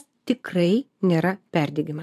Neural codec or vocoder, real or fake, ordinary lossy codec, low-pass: vocoder, 44.1 kHz, 128 mel bands every 512 samples, BigVGAN v2; fake; AAC, 96 kbps; 14.4 kHz